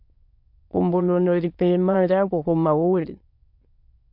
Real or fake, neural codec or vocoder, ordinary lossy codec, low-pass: fake; autoencoder, 22.05 kHz, a latent of 192 numbers a frame, VITS, trained on many speakers; MP3, 48 kbps; 5.4 kHz